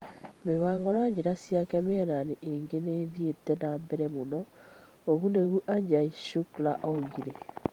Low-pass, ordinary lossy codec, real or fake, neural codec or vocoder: 19.8 kHz; Opus, 24 kbps; fake; vocoder, 44.1 kHz, 128 mel bands every 512 samples, BigVGAN v2